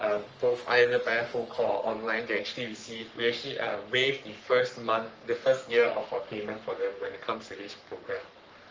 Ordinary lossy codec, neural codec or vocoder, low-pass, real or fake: Opus, 24 kbps; codec, 44.1 kHz, 3.4 kbps, Pupu-Codec; 7.2 kHz; fake